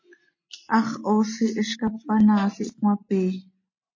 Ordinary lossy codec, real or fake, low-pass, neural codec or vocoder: MP3, 32 kbps; real; 7.2 kHz; none